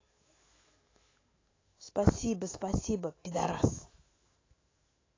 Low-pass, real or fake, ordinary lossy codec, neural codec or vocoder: 7.2 kHz; fake; AAC, 32 kbps; autoencoder, 48 kHz, 128 numbers a frame, DAC-VAE, trained on Japanese speech